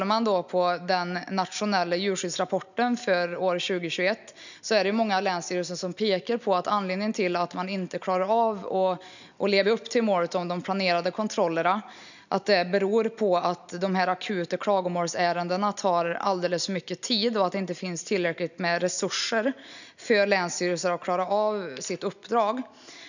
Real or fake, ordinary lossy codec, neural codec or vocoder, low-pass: real; none; none; 7.2 kHz